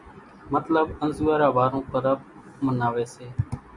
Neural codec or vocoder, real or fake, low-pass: none; real; 10.8 kHz